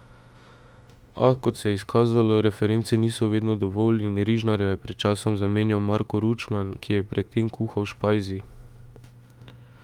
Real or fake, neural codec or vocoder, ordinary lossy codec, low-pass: fake; autoencoder, 48 kHz, 32 numbers a frame, DAC-VAE, trained on Japanese speech; Opus, 32 kbps; 19.8 kHz